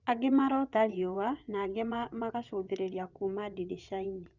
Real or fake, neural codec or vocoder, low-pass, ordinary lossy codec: fake; vocoder, 22.05 kHz, 80 mel bands, WaveNeXt; 7.2 kHz; Opus, 64 kbps